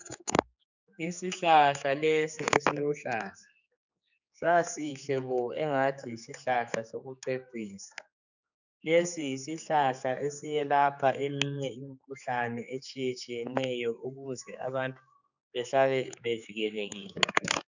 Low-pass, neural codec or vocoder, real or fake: 7.2 kHz; codec, 16 kHz, 4 kbps, X-Codec, HuBERT features, trained on general audio; fake